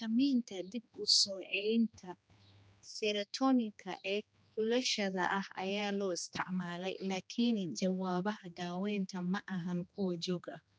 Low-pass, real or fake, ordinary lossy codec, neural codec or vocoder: none; fake; none; codec, 16 kHz, 2 kbps, X-Codec, HuBERT features, trained on general audio